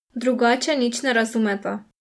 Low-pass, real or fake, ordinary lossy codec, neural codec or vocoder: none; real; none; none